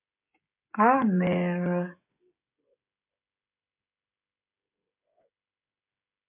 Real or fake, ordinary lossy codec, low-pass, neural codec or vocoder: fake; MP3, 32 kbps; 3.6 kHz; codec, 16 kHz, 16 kbps, FreqCodec, smaller model